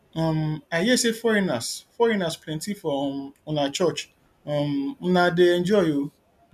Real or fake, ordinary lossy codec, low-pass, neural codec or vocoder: real; none; 14.4 kHz; none